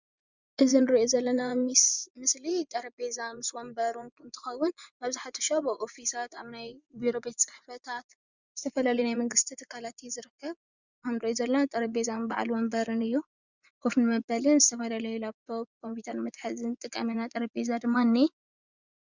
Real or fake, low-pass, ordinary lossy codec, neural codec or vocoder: fake; 7.2 kHz; Opus, 64 kbps; vocoder, 24 kHz, 100 mel bands, Vocos